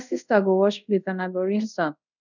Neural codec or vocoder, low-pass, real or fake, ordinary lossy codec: codec, 24 kHz, 0.5 kbps, DualCodec; 7.2 kHz; fake; none